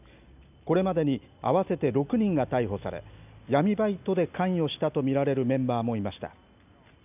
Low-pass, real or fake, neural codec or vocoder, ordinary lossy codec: 3.6 kHz; real; none; none